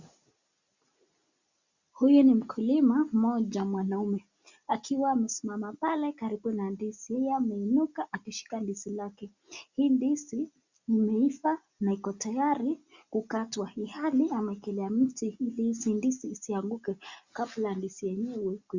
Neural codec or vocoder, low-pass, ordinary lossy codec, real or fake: none; 7.2 kHz; Opus, 64 kbps; real